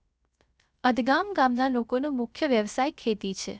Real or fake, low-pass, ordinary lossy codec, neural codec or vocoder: fake; none; none; codec, 16 kHz, 0.3 kbps, FocalCodec